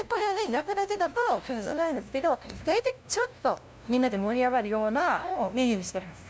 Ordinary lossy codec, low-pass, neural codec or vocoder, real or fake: none; none; codec, 16 kHz, 0.5 kbps, FunCodec, trained on LibriTTS, 25 frames a second; fake